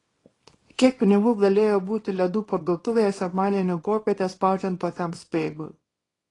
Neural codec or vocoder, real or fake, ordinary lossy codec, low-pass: codec, 24 kHz, 0.9 kbps, WavTokenizer, small release; fake; AAC, 32 kbps; 10.8 kHz